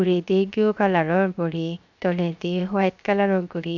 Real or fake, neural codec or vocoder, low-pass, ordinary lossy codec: fake; codec, 16 kHz, 0.7 kbps, FocalCodec; 7.2 kHz; none